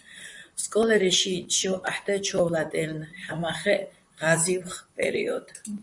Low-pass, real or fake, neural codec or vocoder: 10.8 kHz; fake; vocoder, 44.1 kHz, 128 mel bands, Pupu-Vocoder